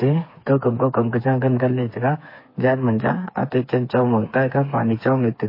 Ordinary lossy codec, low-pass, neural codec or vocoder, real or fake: MP3, 24 kbps; 5.4 kHz; codec, 16 kHz, 4 kbps, FreqCodec, smaller model; fake